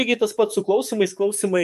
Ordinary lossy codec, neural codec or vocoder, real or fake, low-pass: MP3, 64 kbps; codec, 44.1 kHz, 7.8 kbps, DAC; fake; 14.4 kHz